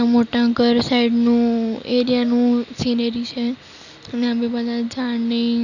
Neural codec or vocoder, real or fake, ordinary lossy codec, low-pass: none; real; none; 7.2 kHz